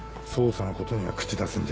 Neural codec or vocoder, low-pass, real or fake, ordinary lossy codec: none; none; real; none